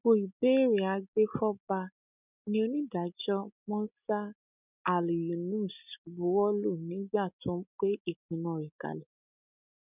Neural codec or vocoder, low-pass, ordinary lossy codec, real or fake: none; 3.6 kHz; none; real